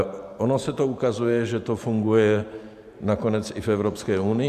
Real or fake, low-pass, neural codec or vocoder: fake; 14.4 kHz; vocoder, 44.1 kHz, 128 mel bands every 512 samples, BigVGAN v2